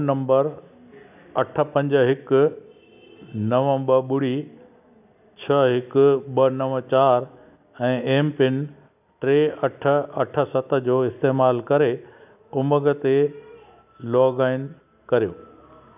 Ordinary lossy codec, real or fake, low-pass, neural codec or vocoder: none; real; 3.6 kHz; none